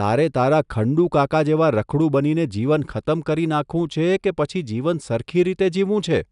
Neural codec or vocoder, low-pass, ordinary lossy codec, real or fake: none; 10.8 kHz; none; real